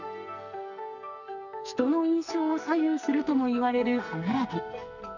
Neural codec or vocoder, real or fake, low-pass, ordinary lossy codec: codec, 44.1 kHz, 2.6 kbps, SNAC; fake; 7.2 kHz; none